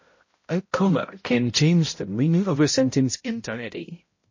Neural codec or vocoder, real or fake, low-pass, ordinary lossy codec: codec, 16 kHz, 0.5 kbps, X-Codec, HuBERT features, trained on balanced general audio; fake; 7.2 kHz; MP3, 32 kbps